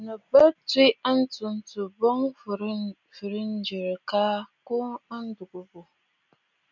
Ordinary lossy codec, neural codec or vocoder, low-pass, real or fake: MP3, 64 kbps; none; 7.2 kHz; real